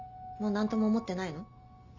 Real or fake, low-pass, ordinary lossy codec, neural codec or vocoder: real; 7.2 kHz; none; none